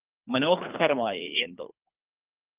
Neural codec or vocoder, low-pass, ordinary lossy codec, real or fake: codec, 24 kHz, 3 kbps, HILCodec; 3.6 kHz; Opus, 32 kbps; fake